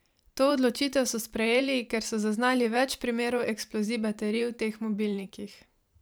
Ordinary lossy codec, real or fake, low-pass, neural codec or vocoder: none; fake; none; vocoder, 44.1 kHz, 128 mel bands every 512 samples, BigVGAN v2